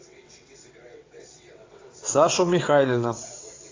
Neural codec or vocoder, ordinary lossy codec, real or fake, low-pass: vocoder, 22.05 kHz, 80 mel bands, WaveNeXt; AAC, 32 kbps; fake; 7.2 kHz